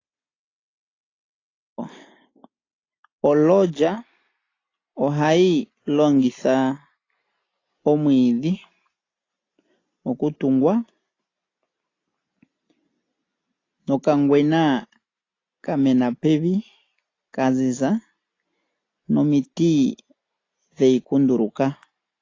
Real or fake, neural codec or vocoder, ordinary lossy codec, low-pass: real; none; AAC, 32 kbps; 7.2 kHz